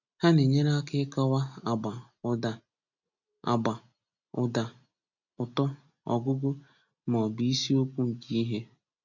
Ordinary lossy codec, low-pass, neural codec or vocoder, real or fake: none; 7.2 kHz; none; real